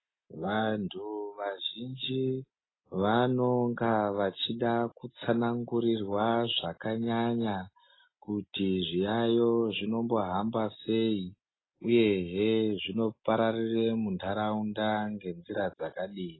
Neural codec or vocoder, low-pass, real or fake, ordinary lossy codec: none; 7.2 kHz; real; AAC, 16 kbps